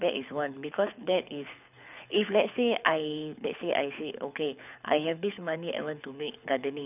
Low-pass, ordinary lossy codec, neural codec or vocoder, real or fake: 3.6 kHz; none; codec, 24 kHz, 6 kbps, HILCodec; fake